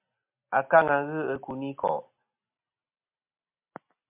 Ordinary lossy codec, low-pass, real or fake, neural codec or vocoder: MP3, 32 kbps; 3.6 kHz; real; none